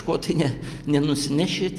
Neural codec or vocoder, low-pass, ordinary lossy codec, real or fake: none; 14.4 kHz; Opus, 32 kbps; real